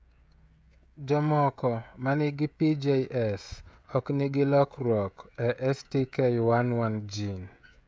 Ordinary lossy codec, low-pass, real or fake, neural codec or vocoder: none; none; fake; codec, 16 kHz, 16 kbps, FreqCodec, smaller model